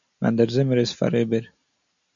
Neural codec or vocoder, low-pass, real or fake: none; 7.2 kHz; real